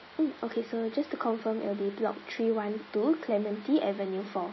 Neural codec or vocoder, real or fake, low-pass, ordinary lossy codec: none; real; 7.2 kHz; MP3, 24 kbps